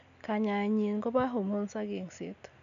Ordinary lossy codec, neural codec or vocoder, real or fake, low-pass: AAC, 96 kbps; none; real; 7.2 kHz